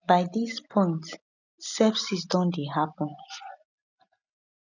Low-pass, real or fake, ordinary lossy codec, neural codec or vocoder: 7.2 kHz; real; none; none